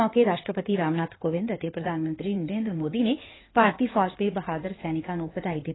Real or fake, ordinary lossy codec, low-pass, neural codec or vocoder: fake; AAC, 16 kbps; 7.2 kHz; codec, 16 kHz in and 24 kHz out, 2.2 kbps, FireRedTTS-2 codec